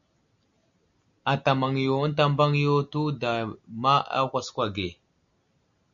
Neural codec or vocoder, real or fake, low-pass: none; real; 7.2 kHz